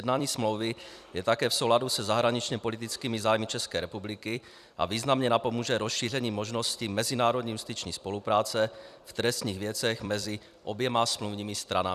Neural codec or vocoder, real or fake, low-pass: vocoder, 44.1 kHz, 128 mel bands every 256 samples, BigVGAN v2; fake; 14.4 kHz